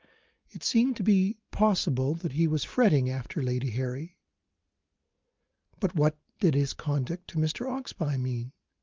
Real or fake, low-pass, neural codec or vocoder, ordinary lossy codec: real; 7.2 kHz; none; Opus, 24 kbps